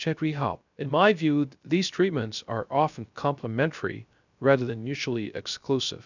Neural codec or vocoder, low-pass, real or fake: codec, 16 kHz, 0.3 kbps, FocalCodec; 7.2 kHz; fake